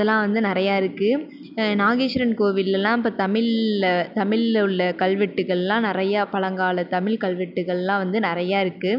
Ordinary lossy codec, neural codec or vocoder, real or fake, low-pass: none; none; real; 5.4 kHz